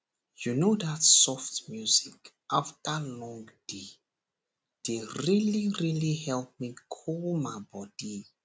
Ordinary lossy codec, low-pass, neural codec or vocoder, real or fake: none; none; none; real